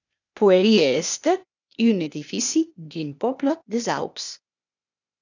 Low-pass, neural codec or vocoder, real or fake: 7.2 kHz; codec, 16 kHz, 0.8 kbps, ZipCodec; fake